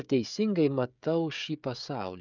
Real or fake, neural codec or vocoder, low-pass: fake; codec, 16 kHz, 16 kbps, FreqCodec, smaller model; 7.2 kHz